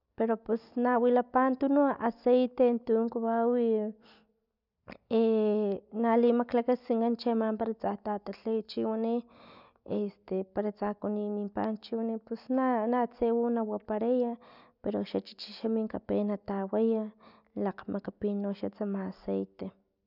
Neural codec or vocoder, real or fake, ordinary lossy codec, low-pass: none; real; none; 5.4 kHz